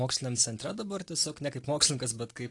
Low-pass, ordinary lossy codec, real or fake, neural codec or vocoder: 10.8 kHz; AAC, 48 kbps; real; none